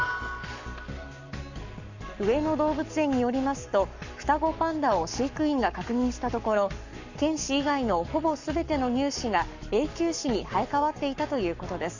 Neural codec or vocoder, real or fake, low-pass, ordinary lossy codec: codec, 44.1 kHz, 7.8 kbps, Pupu-Codec; fake; 7.2 kHz; none